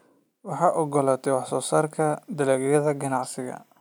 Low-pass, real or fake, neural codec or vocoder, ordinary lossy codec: none; real; none; none